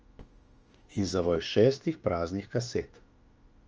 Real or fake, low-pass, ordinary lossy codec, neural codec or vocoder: fake; 7.2 kHz; Opus, 24 kbps; autoencoder, 48 kHz, 32 numbers a frame, DAC-VAE, trained on Japanese speech